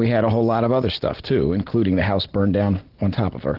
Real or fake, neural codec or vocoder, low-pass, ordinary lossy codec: real; none; 5.4 kHz; Opus, 16 kbps